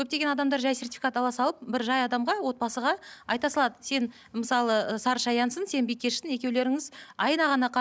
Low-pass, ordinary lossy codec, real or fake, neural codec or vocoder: none; none; real; none